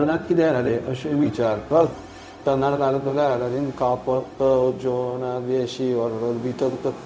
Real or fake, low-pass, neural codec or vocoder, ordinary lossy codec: fake; none; codec, 16 kHz, 0.4 kbps, LongCat-Audio-Codec; none